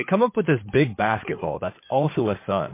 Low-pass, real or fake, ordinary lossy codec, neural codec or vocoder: 3.6 kHz; fake; MP3, 24 kbps; codec, 16 kHz in and 24 kHz out, 2.2 kbps, FireRedTTS-2 codec